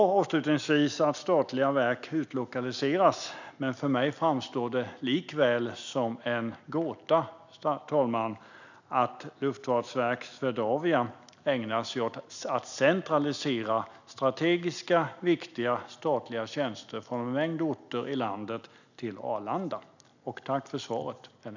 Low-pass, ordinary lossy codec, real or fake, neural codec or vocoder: 7.2 kHz; none; real; none